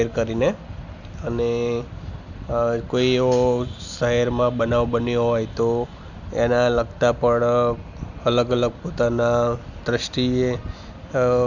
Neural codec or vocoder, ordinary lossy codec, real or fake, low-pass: none; none; real; 7.2 kHz